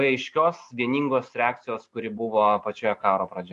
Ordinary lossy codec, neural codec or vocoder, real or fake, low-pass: AAC, 64 kbps; none; real; 10.8 kHz